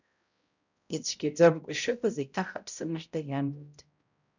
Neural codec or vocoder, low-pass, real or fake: codec, 16 kHz, 0.5 kbps, X-Codec, HuBERT features, trained on balanced general audio; 7.2 kHz; fake